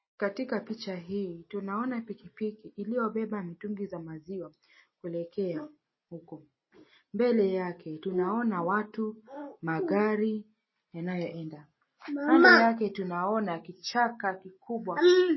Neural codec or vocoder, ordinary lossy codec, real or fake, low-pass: none; MP3, 24 kbps; real; 7.2 kHz